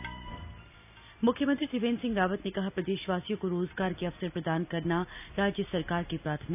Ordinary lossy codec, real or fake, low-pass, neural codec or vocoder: none; real; 3.6 kHz; none